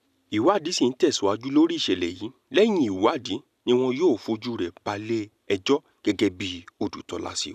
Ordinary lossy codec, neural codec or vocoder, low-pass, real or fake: none; none; 14.4 kHz; real